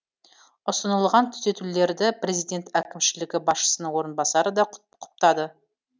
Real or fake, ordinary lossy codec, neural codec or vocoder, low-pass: real; none; none; none